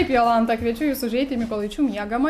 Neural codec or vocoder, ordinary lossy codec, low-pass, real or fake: none; MP3, 96 kbps; 14.4 kHz; real